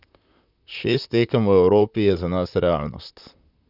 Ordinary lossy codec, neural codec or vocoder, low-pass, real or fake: none; vocoder, 44.1 kHz, 128 mel bands, Pupu-Vocoder; 5.4 kHz; fake